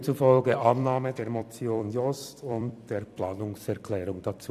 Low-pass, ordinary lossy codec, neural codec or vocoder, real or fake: 14.4 kHz; none; vocoder, 44.1 kHz, 128 mel bands every 256 samples, BigVGAN v2; fake